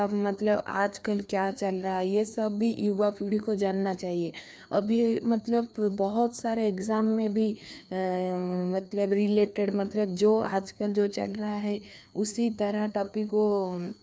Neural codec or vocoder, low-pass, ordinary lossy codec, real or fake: codec, 16 kHz, 2 kbps, FreqCodec, larger model; none; none; fake